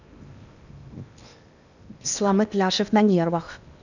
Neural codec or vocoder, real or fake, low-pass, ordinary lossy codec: codec, 16 kHz in and 24 kHz out, 0.8 kbps, FocalCodec, streaming, 65536 codes; fake; 7.2 kHz; none